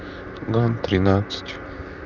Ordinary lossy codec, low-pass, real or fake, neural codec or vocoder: none; 7.2 kHz; fake; vocoder, 44.1 kHz, 128 mel bands, Pupu-Vocoder